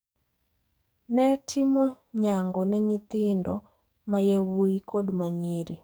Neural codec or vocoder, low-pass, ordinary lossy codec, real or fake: codec, 44.1 kHz, 2.6 kbps, SNAC; none; none; fake